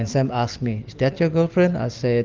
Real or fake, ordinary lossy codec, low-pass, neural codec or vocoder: real; Opus, 32 kbps; 7.2 kHz; none